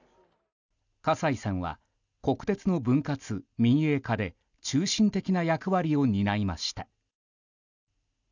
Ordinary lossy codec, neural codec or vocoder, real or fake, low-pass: none; none; real; 7.2 kHz